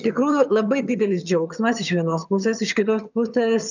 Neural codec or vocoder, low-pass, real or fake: vocoder, 22.05 kHz, 80 mel bands, HiFi-GAN; 7.2 kHz; fake